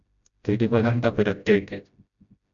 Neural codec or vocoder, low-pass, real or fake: codec, 16 kHz, 0.5 kbps, FreqCodec, smaller model; 7.2 kHz; fake